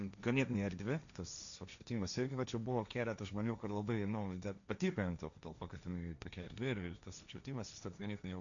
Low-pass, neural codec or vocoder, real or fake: 7.2 kHz; codec, 16 kHz, 1.1 kbps, Voila-Tokenizer; fake